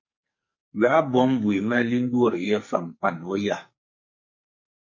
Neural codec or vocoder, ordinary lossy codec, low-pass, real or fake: codec, 44.1 kHz, 2.6 kbps, SNAC; MP3, 32 kbps; 7.2 kHz; fake